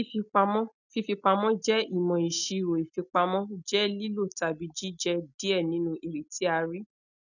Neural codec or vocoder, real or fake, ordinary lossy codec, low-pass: none; real; none; 7.2 kHz